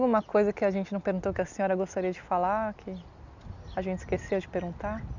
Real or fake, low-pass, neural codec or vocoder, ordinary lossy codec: real; 7.2 kHz; none; none